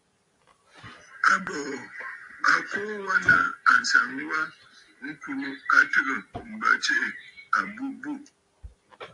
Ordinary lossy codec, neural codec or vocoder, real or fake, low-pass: AAC, 64 kbps; none; real; 10.8 kHz